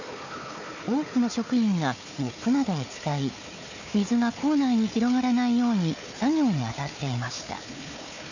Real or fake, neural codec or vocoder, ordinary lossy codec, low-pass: fake; codec, 16 kHz, 4 kbps, FunCodec, trained on Chinese and English, 50 frames a second; none; 7.2 kHz